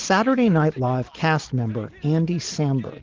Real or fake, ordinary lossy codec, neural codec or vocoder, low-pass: real; Opus, 16 kbps; none; 7.2 kHz